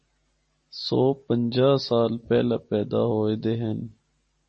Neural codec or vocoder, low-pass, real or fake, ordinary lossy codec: none; 10.8 kHz; real; MP3, 32 kbps